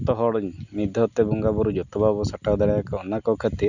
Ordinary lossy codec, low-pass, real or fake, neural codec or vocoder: none; 7.2 kHz; real; none